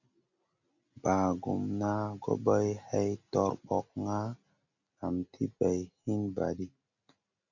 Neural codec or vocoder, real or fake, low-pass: none; real; 7.2 kHz